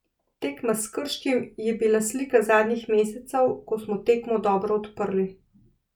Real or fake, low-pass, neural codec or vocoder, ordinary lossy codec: real; 19.8 kHz; none; none